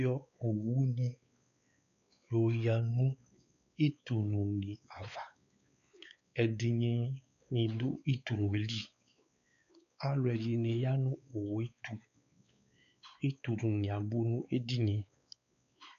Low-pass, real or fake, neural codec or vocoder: 7.2 kHz; fake; codec, 16 kHz, 4 kbps, X-Codec, WavLM features, trained on Multilingual LibriSpeech